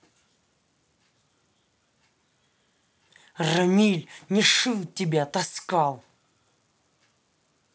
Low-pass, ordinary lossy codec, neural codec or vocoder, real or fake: none; none; none; real